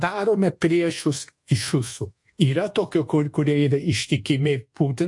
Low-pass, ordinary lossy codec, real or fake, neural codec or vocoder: 10.8 kHz; MP3, 48 kbps; fake; codec, 24 kHz, 1.2 kbps, DualCodec